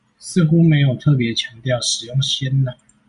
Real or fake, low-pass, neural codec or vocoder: real; 10.8 kHz; none